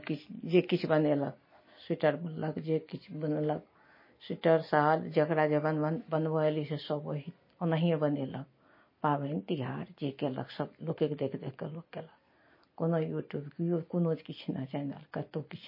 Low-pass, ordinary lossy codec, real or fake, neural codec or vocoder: 5.4 kHz; MP3, 24 kbps; real; none